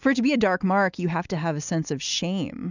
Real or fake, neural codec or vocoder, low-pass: real; none; 7.2 kHz